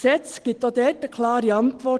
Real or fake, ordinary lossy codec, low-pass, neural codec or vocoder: real; Opus, 16 kbps; 10.8 kHz; none